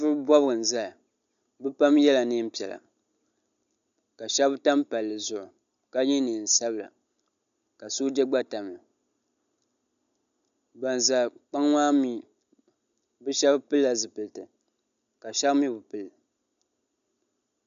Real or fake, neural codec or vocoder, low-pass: real; none; 7.2 kHz